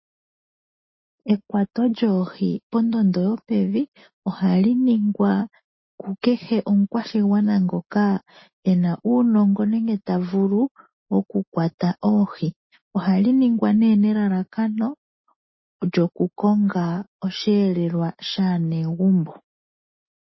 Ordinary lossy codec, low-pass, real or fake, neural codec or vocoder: MP3, 24 kbps; 7.2 kHz; real; none